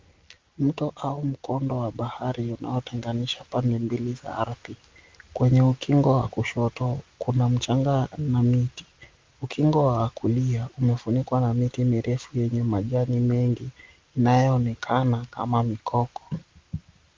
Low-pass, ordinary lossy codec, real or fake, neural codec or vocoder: 7.2 kHz; Opus, 32 kbps; real; none